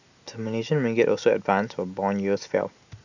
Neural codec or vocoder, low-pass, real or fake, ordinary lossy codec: none; 7.2 kHz; real; none